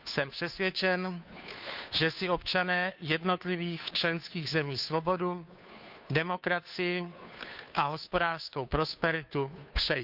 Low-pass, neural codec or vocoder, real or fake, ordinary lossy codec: 5.4 kHz; codec, 16 kHz, 2 kbps, FunCodec, trained on Chinese and English, 25 frames a second; fake; none